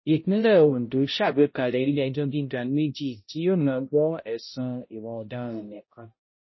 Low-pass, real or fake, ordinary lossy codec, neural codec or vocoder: 7.2 kHz; fake; MP3, 24 kbps; codec, 16 kHz, 0.5 kbps, X-Codec, HuBERT features, trained on balanced general audio